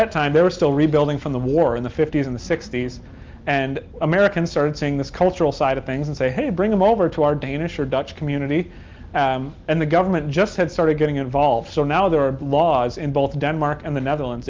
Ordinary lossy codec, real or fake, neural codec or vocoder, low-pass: Opus, 32 kbps; real; none; 7.2 kHz